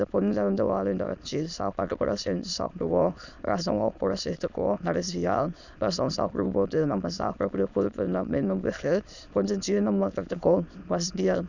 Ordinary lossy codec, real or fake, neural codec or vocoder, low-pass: none; fake; autoencoder, 22.05 kHz, a latent of 192 numbers a frame, VITS, trained on many speakers; 7.2 kHz